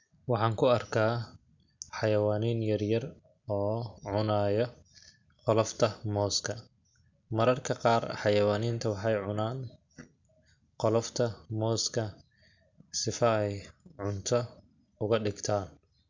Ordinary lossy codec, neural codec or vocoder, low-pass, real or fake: MP3, 64 kbps; none; 7.2 kHz; real